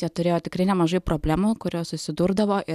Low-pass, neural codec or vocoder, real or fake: 14.4 kHz; none; real